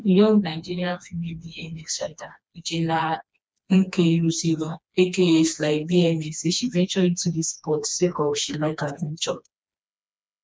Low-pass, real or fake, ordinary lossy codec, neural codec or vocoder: none; fake; none; codec, 16 kHz, 2 kbps, FreqCodec, smaller model